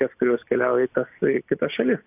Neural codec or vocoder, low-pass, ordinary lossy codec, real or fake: none; 3.6 kHz; AAC, 32 kbps; real